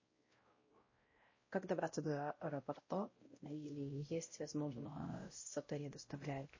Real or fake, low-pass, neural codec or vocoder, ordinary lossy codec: fake; 7.2 kHz; codec, 16 kHz, 0.5 kbps, X-Codec, WavLM features, trained on Multilingual LibriSpeech; MP3, 32 kbps